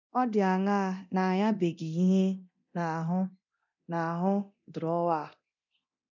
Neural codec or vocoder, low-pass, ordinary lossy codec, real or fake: codec, 24 kHz, 0.9 kbps, DualCodec; 7.2 kHz; none; fake